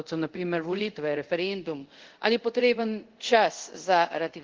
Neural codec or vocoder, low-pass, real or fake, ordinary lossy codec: codec, 24 kHz, 0.5 kbps, DualCodec; 7.2 kHz; fake; Opus, 16 kbps